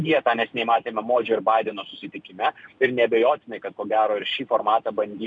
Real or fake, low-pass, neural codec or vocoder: real; 9.9 kHz; none